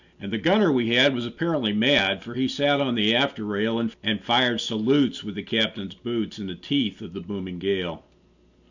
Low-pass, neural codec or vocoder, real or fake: 7.2 kHz; none; real